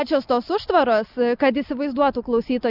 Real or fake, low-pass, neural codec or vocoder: real; 5.4 kHz; none